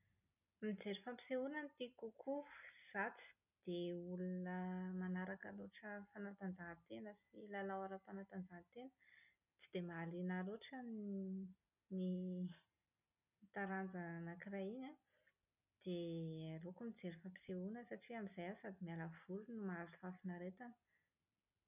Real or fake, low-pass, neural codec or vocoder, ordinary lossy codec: real; 3.6 kHz; none; none